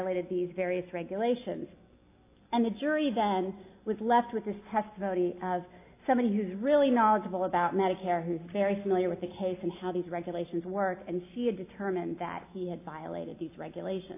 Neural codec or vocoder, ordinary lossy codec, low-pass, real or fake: none; AAC, 24 kbps; 3.6 kHz; real